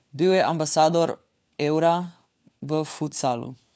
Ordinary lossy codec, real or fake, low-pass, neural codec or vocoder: none; fake; none; codec, 16 kHz, 4 kbps, FunCodec, trained on LibriTTS, 50 frames a second